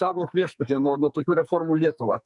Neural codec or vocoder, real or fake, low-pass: codec, 44.1 kHz, 2.6 kbps, SNAC; fake; 10.8 kHz